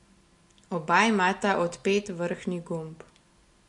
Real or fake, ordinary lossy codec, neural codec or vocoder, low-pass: real; MP3, 64 kbps; none; 10.8 kHz